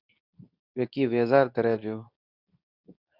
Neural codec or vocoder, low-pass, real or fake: codec, 24 kHz, 0.9 kbps, WavTokenizer, medium speech release version 2; 5.4 kHz; fake